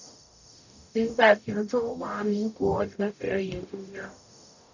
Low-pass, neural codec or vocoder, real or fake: 7.2 kHz; codec, 44.1 kHz, 0.9 kbps, DAC; fake